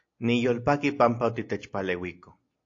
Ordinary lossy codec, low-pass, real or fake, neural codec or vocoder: AAC, 48 kbps; 7.2 kHz; real; none